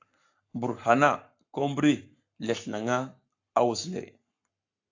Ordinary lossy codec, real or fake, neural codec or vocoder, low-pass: AAC, 48 kbps; fake; codec, 44.1 kHz, 7.8 kbps, Pupu-Codec; 7.2 kHz